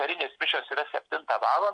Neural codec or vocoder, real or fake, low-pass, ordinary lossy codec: none; real; 9.9 kHz; MP3, 64 kbps